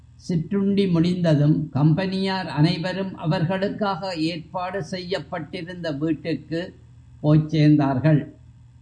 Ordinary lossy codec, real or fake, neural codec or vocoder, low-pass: MP3, 96 kbps; real; none; 9.9 kHz